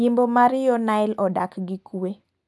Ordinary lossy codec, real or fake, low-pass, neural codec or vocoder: none; real; none; none